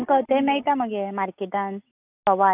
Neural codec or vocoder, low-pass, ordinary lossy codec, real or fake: none; 3.6 kHz; none; real